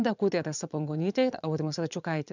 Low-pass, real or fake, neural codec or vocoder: 7.2 kHz; fake; vocoder, 22.05 kHz, 80 mel bands, Vocos